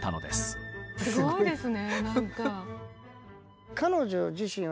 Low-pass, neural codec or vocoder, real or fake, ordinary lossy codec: none; none; real; none